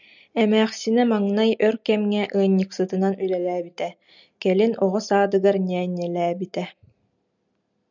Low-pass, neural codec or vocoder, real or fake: 7.2 kHz; none; real